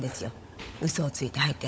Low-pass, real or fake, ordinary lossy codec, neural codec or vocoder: none; fake; none; codec, 16 kHz, 16 kbps, FunCodec, trained on LibriTTS, 50 frames a second